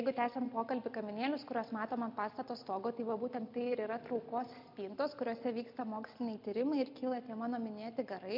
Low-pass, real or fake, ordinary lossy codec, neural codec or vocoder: 5.4 kHz; real; MP3, 48 kbps; none